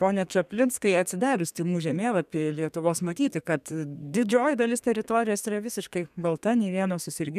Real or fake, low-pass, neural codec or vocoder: fake; 14.4 kHz; codec, 32 kHz, 1.9 kbps, SNAC